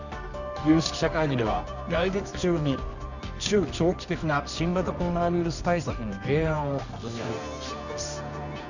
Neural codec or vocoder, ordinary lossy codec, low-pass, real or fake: codec, 24 kHz, 0.9 kbps, WavTokenizer, medium music audio release; none; 7.2 kHz; fake